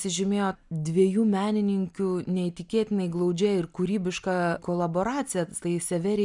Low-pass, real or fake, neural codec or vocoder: 10.8 kHz; real; none